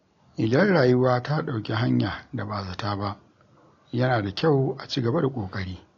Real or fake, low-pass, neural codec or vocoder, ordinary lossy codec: real; 7.2 kHz; none; AAC, 48 kbps